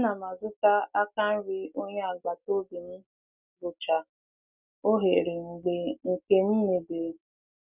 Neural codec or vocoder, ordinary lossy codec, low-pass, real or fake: none; none; 3.6 kHz; real